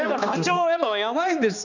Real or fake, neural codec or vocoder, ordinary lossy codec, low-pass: fake; codec, 16 kHz, 2 kbps, X-Codec, HuBERT features, trained on balanced general audio; none; 7.2 kHz